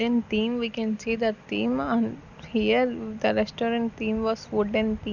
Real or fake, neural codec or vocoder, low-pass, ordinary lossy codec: real; none; 7.2 kHz; none